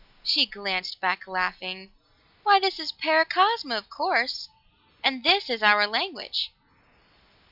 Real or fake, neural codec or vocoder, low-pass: real; none; 5.4 kHz